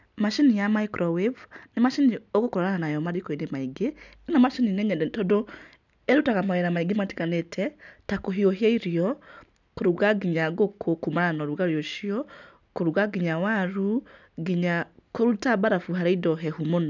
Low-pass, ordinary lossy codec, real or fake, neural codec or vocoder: 7.2 kHz; none; real; none